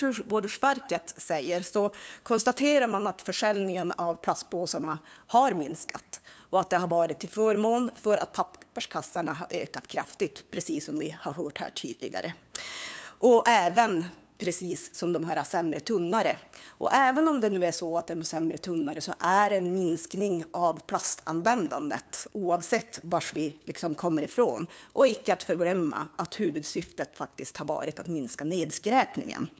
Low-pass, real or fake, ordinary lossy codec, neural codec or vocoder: none; fake; none; codec, 16 kHz, 2 kbps, FunCodec, trained on LibriTTS, 25 frames a second